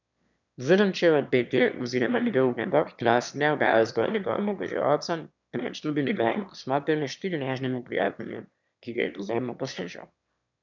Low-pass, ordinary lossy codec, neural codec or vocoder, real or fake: 7.2 kHz; none; autoencoder, 22.05 kHz, a latent of 192 numbers a frame, VITS, trained on one speaker; fake